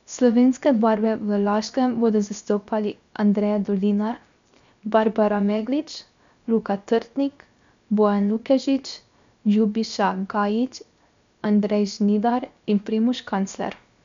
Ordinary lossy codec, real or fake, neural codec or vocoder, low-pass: MP3, 64 kbps; fake; codec, 16 kHz, 0.7 kbps, FocalCodec; 7.2 kHz